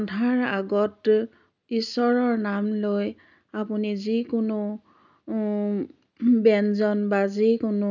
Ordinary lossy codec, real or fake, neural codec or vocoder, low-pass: none; real; none; 7.2 kHz